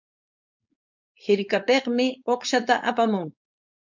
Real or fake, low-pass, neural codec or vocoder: fake; 7.2 kHz; codec, 16 kHz, 4.8 kbps, FACodec